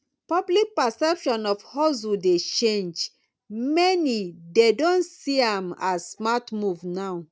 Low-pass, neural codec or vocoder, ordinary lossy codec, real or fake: none; none; none; real